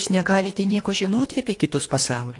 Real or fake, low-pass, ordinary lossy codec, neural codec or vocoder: fake; 10.8 kHz; AAC, 48 kbps; codec, 24 kHz, 1.5 kbps, HILCodec